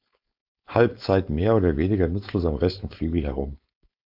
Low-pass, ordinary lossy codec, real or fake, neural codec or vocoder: 5.4 kHz; MP3, 48 kbps; fake; codec, 16 kHz, 4.8 kbps, FACodec